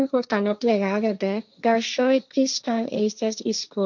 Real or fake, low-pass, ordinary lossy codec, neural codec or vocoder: fake; 7.2 kHz; none; codec, 16 kHz, 1.1 kbps, Voila-Tokenizer